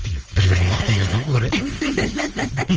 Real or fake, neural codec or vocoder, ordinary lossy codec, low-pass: fake; codec, 16 kHz, 4.8 kbps, FACodec; Opus, 24 kbps; 7.2 kHz